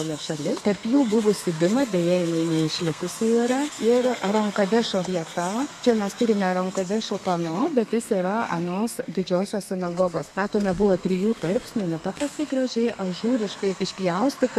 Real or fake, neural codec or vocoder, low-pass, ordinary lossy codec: fake; codec, 32 kHz, 1.9 kbps, SNAC; 14.4 kHz; AAC, 96 kbps